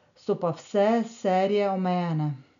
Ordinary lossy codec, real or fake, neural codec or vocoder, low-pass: none; real; none; 7.2 kHz